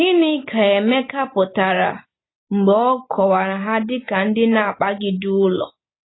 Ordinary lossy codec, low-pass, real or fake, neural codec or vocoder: AAC, 16 kbps; 7.2 kHz; real; none